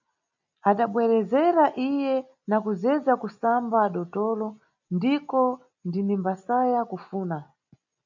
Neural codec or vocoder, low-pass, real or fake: none; 7.2 kHz; real